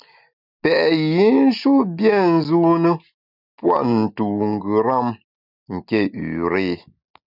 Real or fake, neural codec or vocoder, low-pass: real; none; 5.4 kHz